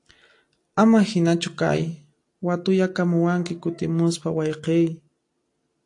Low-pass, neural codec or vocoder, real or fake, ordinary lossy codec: 10.8 kHz; none; real; AAC, 48 kbps